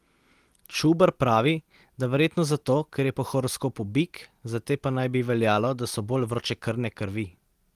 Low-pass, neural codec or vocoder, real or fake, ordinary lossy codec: 14.4 kHz; none; real; Opus, 24 kbps